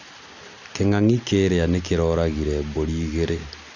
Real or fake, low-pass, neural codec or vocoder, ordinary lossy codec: real; 7.2 kHz; none; none